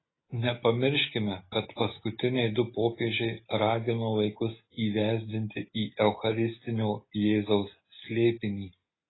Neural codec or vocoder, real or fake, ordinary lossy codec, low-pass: none; real; AAC, 16 kbps; 7.2 kHz